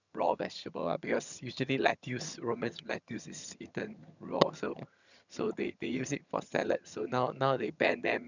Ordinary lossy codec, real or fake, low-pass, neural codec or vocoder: none; fake; 7.2 kHz; vocoder, 22.05 kHz, 80 mel bands, HiFi-GAN